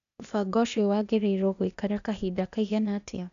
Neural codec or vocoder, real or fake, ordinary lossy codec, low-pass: codec, 16 kHz, 0.8 kbps, ZipCodec; fake; none; 7.2 kHz